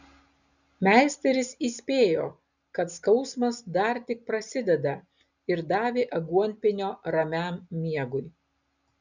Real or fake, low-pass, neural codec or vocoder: real; 7.2 kHz; none